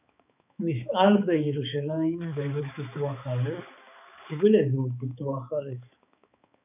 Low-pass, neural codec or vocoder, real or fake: 3.6 kHz; codec, 16 kHz, 4 kbps, X-Codec, HuBERT features, trained on balanced general audio; fake